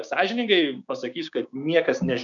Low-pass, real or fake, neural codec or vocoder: 7.2 kHz; fake; codec, 16 kHz, 6 kbps, DAC